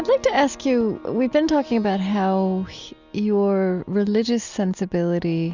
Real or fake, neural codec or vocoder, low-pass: real; none; 7.2 kHz